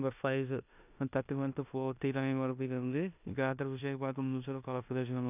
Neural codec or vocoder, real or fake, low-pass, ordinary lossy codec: codec, 16 kHz in and 24 kHz out, 0.9 kbps, LongCat-Audio-Codec, four codebook decoder; fake; 3.6 kHz; none